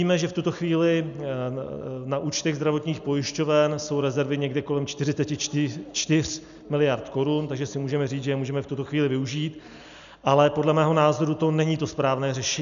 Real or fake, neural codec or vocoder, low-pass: real; none; 7.2 kHz